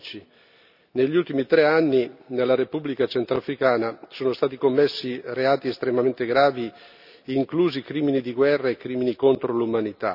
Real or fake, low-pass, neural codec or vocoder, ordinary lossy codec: real; 5.4 kHz; none; none